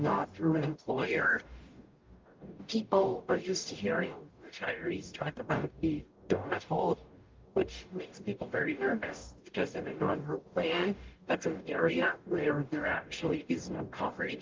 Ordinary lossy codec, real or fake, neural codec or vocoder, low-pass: Opus, 32 kbps; fake; codec, 44.1 kHz, 0.9 kbps, DAC; 7.2 kHz